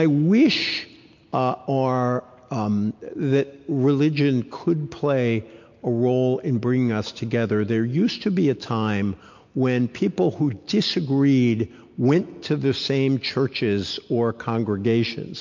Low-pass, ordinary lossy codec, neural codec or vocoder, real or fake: 7.2 kHz; MP3, 48 kbps; none; real